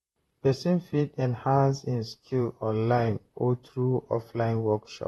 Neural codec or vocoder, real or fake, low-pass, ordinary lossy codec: vocoder, 44.1 kHz, 128 mel bands, Pupu-Vocoder; fake; 19.8 kHz; AAC, 32 kbps